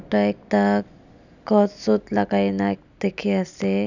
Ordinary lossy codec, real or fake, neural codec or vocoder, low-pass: none; real; none; 7.2 kHz